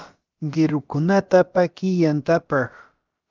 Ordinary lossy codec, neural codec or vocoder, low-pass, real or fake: Opus, 24 kbps; codec, 16 kHz, about 1 kbps, DyCAST, with the encoder's durations; 7.2 kHz; fake